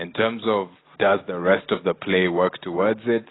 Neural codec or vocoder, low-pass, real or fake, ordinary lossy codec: none; 7.2 kHz; real; AAC, 16 kbps